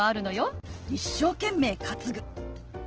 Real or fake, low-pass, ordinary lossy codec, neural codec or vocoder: real; 7.2 kHz; Opus, 16 kbps; none